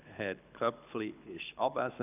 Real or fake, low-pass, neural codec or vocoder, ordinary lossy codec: fake; 3.6 kHz; codec, 24 kHz, 6 kbps, HILCodec; none